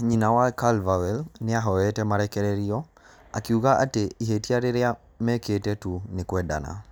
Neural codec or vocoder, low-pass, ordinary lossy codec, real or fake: none; none; none; real